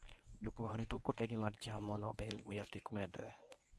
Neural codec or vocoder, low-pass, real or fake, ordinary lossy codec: codec, 24 kHz, 0.9 kbps, WavTokenizer, medium speech release version 1; none; fake; none